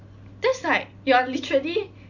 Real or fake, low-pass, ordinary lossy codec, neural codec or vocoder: real; 7.2 kHz; none; none